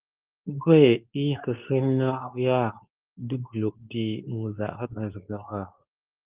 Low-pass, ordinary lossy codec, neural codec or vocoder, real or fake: 3.6 kHz; Opus, 32 kbps; codec, 24 kHz, 0.9 kbps, WavTokenizer, medium speech release version 2; fake